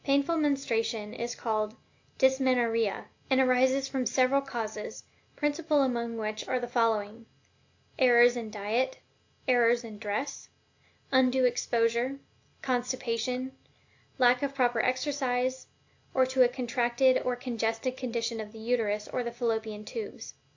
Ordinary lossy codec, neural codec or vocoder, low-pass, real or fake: AAC, 48 kbps; none; 7.2 kHz; real